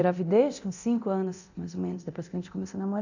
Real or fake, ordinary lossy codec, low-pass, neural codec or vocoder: fake; none; 7.2 kHz; codec, 24 kHz, 0.9 kbps, DualCodec